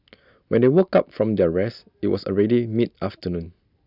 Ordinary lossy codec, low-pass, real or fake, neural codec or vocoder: none; 5.4 kHz; real; none